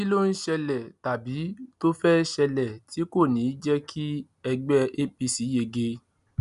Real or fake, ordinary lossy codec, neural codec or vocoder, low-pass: real; none; none; 10.8 kHz